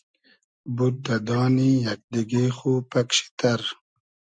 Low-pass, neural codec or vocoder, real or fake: 9.9 kHz; none; real